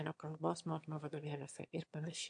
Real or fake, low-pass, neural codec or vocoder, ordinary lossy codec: fake; 9.9 kHz; autoencoder, 22.05 kHz, a latent of 192 numbers a frame, VITS, trained on one speaker; AAC, 64 kbps